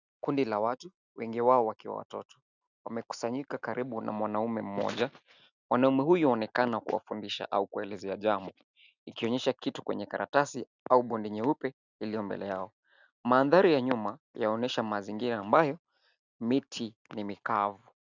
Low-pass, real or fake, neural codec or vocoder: 7.2 kHz; real; none